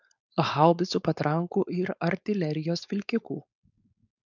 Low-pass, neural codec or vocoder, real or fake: 7.2 kHz; codec, 16 kHz, 4.8 kbps, FACodec; fake